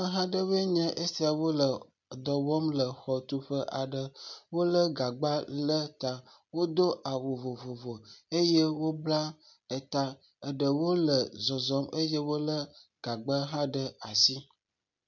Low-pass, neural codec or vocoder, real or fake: 7.2 kHz; none; real